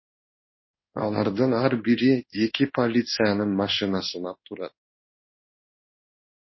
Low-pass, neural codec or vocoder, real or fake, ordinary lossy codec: 7.2 kHz; codec, 16 kHz in and 24 kHz out, 1 kbps, XY-Tokenizer; fake; MP3, 24 kbps